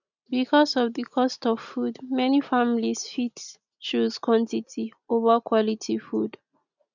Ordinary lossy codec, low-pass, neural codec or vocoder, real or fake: none; 7.2 kHz; none; real